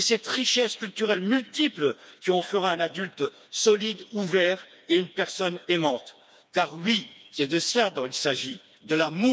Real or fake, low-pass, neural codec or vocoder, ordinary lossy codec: fake; none; codec, 16 kHz, 2 kbps, FreqCodec, smaller model; none